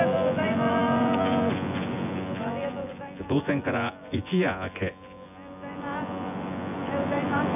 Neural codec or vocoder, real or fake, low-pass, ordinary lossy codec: vocoder, 24 kHz, 100 mel bands, Vocos; fake; 3.6 kHz; none